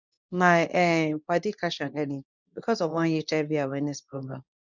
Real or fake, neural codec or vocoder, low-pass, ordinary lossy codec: fake; codec, 24 kHz, 0.9 kbps, WavTokenizer, medium speech release version 2; 7.2 kHz; none